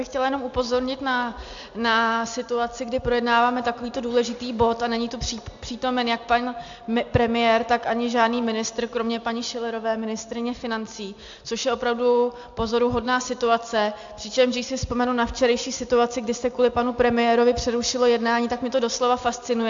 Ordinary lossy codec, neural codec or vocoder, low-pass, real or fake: AAC, 64 kbps; none; 7.2 kHz; real